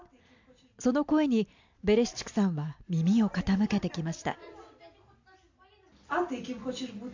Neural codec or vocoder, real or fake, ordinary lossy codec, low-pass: none; real; AAC, 48 kbps; 7.2 kHz